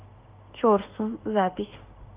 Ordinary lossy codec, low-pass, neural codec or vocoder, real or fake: Opus, 24 kbps; 3.6 kHz; autoencoder, 48 kHz, 32 numbers a frame, DAC-VAE, trained on Japanese speech; fake